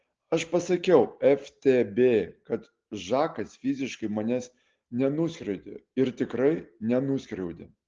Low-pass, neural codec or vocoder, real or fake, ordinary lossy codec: 7.2 kHz; none; real; Opus, 16 kbps